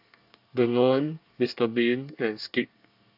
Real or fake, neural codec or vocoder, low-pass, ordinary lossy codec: fake; codec, 24 kHz, 1 kbps, SNAC; 5.4 kHz; AAC, 48 kbps